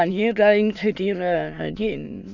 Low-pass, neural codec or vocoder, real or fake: 7.2 kHz; autoencoder, 22.05 kHz, a latent of 192 numbers a frame, VITS, trained on many speakers; fake